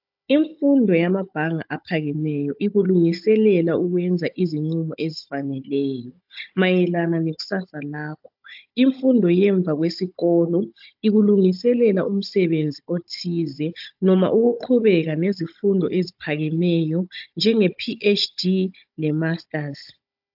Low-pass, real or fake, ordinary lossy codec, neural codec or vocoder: 5.4 kHz; fake; AAC, 48 kbps; codec, 16 kHz, 16 kbps, FunCodec, trained on Chinese and English, 50 frames a second